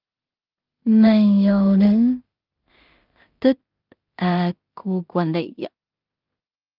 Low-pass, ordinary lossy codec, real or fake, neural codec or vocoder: 5.4 kHz; Opus, 24 kbps; fake; codec, 16 kHz in and 24 kHz out, 0.4 kbps, LongCat-Audio-Codec, two codebook decoder